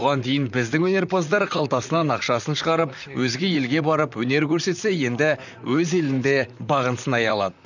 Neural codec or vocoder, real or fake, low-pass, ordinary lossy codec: vocoder, 44.1 kHz, 128 mel bands, Pupu-Vocoder; fake; 7.2 kHz; none